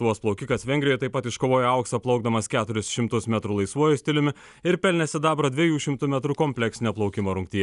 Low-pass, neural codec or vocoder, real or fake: 10.8 kHz; none; real